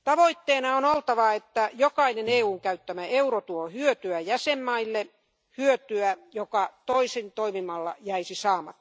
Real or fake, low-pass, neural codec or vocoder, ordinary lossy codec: real; none; none; none